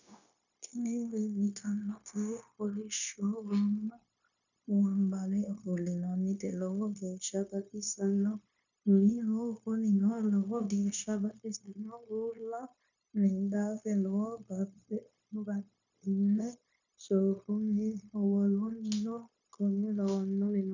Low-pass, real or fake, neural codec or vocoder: 7.2 kHz; fake; codec, 16 kHz, 0.9 kbps, LongCat-Audio-Codec